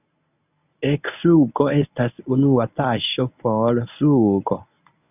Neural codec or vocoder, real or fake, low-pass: codec, 24 kHz, 0.9 kbps, WavTokenizer, medium speech release version 1; fake; 3.6 kHz